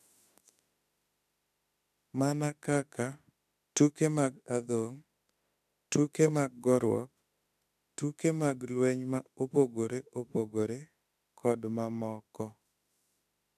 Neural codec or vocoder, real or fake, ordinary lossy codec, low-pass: autoencoder, 48 kHz, 32 numbers a frame, DAC-VAE, trained on Japanese speech; fake; AAC, 96 kbps; 14.4 kHz